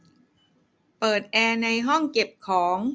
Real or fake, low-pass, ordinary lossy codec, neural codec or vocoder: real; none; none; none